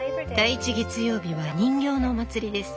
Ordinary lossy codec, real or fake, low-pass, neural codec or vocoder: none; real; none; none